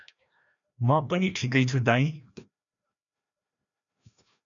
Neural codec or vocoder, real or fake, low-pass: codec, 16 kHz, 1 kbps, FreqCodec, larger model; fake; 7.2 kHz